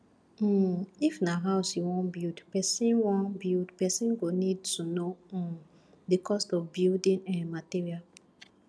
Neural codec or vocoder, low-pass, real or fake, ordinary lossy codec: none; none; real; none